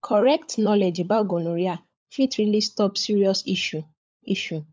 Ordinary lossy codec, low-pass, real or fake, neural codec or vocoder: none; none; fake; codec, 16 kHz, 16 kbps, FunCodec, trained on LibriTTS, 50 frames a second